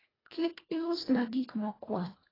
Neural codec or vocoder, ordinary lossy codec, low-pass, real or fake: codec, 24 kHz, 1.5 kbps, HILCodec; AAC, 24 kbps; 5.4 kHz; fake